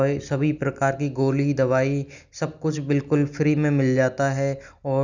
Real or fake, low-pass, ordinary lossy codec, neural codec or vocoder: real; 7.2 kHz; none; none